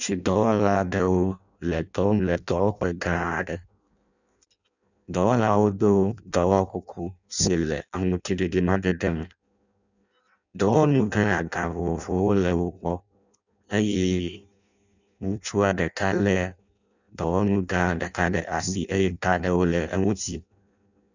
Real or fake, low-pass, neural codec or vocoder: fake; 7.2 kHz; codec, 16 kHz in and 24 kHz out, 0.6 kbps, FireRedTTS-2 codec